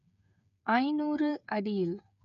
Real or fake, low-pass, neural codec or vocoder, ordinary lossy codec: fake; 7.2 kHz; codec, 16 kHz, 8 kbps, FreqCodec, smaller model; none